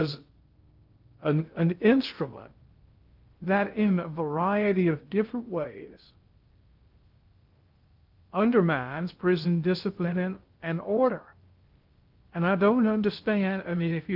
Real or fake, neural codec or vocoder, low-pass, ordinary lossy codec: fake; codec, 16 kHz in and 24 kHz out, 0.6 kbps, FocalCodec, streaming, 2048 codes; 5.4 kHz; Opus, 24 kbps